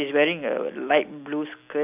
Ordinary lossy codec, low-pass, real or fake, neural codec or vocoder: none; 3.6 kHz; real; none